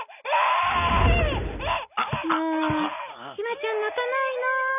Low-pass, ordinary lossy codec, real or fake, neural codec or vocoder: 3.6 kHz; none; real; none